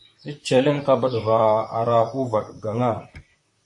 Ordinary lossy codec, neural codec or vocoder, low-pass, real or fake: MP3, 48 kbps; vocoder, 44.1 kHz, 128 mel bands, Pupu-Vocoder; 10.8 kHz; fake